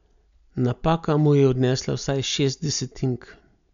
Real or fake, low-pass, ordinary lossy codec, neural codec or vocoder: real; 7.2 kHz; none; none